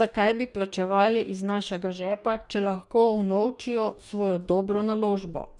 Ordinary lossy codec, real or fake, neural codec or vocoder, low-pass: none; fake; codec, 44.1 kHz, 2.6 kbps, DAC; 10.8 kHz